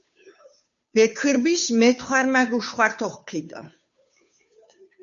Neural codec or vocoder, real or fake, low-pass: codec, 16 kHz, 2 kbps, FunCodec, trained on Chinese and English, 25 frames a second; fake; 7.2 kHz